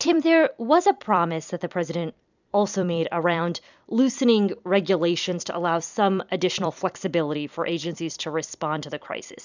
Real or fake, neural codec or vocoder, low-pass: fake; vocoder, 44.1 kHz, 128 mel bands every 256 samples, BigVGAN v2; 7.2 kHz